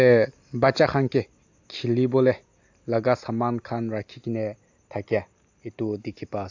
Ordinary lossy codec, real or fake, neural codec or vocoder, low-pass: AAC, 48 kbps; real; none; 7.2 kHz